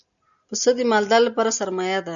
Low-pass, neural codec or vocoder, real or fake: 7.2 kHz; none; real